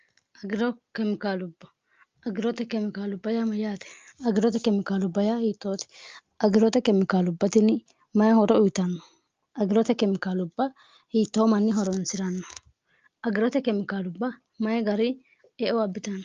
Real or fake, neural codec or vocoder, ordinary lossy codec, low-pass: real; none; Opus, 32 kbps; 7.2 kHz